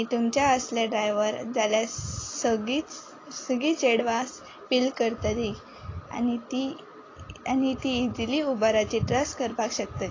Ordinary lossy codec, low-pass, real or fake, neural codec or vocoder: AAC, 32 kbps; 7.2 kHz; real; none